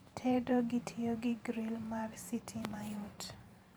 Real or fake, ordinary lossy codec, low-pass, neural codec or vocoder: fake; none; none; vocoder, 44.1 kHz, 128 mel bands every 512 samples, BigVGAN v2